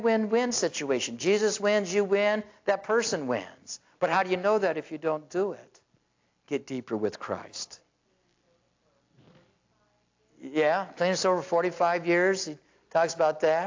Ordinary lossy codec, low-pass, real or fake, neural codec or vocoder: AAC, 48 kbps; 7.2 kHz; real; none